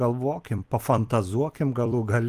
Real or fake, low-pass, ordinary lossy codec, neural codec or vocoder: fake; 14.4 kHz; Opus, 24 kbps; vocoder, 44.1 kHz, 128 mel bands every 256 samples, BigVGAN v2